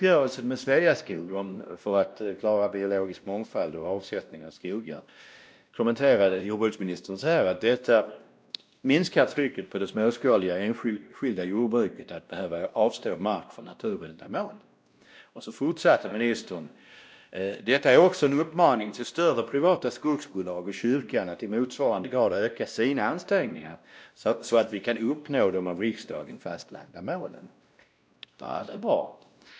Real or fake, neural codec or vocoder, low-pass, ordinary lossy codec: fake; codec, 16 kHz, 1 kbps, X-Codec, WavLM features, trained on Multilingual LibriSpeech; none; none